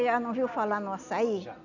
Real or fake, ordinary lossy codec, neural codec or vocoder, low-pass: real; none; none; 7.2 kHz